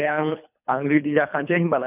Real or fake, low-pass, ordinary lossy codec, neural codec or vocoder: fake; 3.6 kHz; none; codec, 24 kHz, 3 kbps, HILCodec